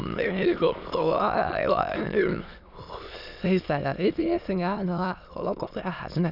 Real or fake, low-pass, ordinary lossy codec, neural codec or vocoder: fake; 5.4 kHz; none; autoencoder, 22.05 kHz, a latent of 192 numbers a frame, VITS, trained on many speakers